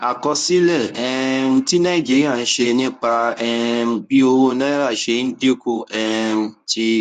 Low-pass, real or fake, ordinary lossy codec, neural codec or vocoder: 10.8 kHz; fake; none; codec, 24 kHz, 0.9 kbps, WavTokenizer, medium speech release version 1